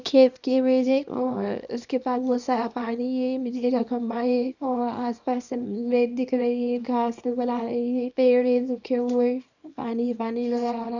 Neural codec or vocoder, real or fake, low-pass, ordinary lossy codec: codec, 24 kHz, 0.9 kbps, WavTokenizer, small release; fake; 7.2 kHz; none